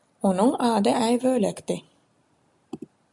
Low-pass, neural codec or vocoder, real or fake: 10.8 kHz; none; real